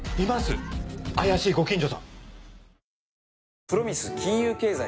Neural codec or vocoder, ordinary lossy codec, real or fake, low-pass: none; none; real; none